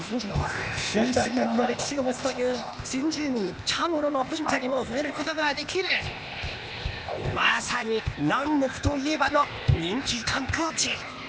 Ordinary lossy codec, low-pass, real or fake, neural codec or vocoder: none; none; fake; codec, 16 kHz, 0.8 kbps, ZipCodec